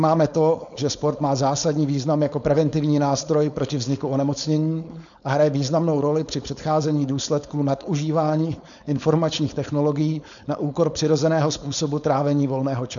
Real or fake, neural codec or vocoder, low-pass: fake; codec, 16 kHz, 4.8 kbps, FACodec; 7.2 kHz